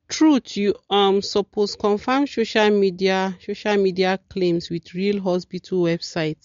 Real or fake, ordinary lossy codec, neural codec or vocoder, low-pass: real; MP3, 48 kbps; none; 7.2 kHz